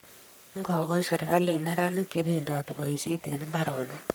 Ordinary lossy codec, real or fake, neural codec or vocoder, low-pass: none; fake; codec, 44.1 kHz, 1.7 kbps, Pupu-Codec; none